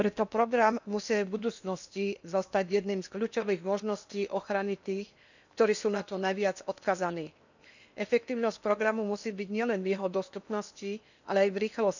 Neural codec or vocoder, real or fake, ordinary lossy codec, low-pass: codec, 16 kHz in and 24 kHz out, 0.8 kbps, FocalCodec, streaming, 65536 codes; fake; none; 7.2 kHz